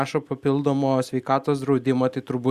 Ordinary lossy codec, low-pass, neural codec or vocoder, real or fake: Opus, 64 kbps; 14.4 kHz; none; real